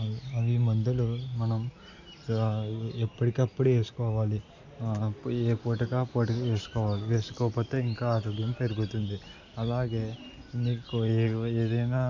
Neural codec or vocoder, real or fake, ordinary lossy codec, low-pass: none; real; none; 7.2 kHz